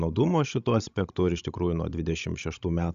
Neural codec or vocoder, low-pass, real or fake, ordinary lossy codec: codec, 16 kHz, 16 kbps, FreqCodec, larger model; 7.2 kHz; fake; AAC, 96 kbps